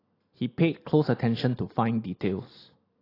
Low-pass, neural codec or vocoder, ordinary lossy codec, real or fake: 5.4 kHz; none; AAC, 24 kbps; real